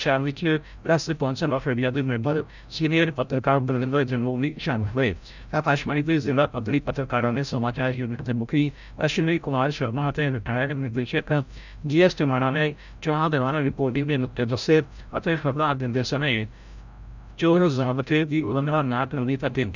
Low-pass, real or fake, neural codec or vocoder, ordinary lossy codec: 7.2 kHz; fake; codec, 16 kHz, 0.5 kbps, FreqCodec, larger model; none